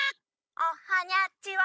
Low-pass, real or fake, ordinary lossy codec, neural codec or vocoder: none; fake; none; codec, 16 kHz, 16 kbps, FunCodec, trained on Chinese and English, 50 frames a second